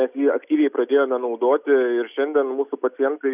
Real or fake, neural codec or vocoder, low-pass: real; none; 3.6 kHz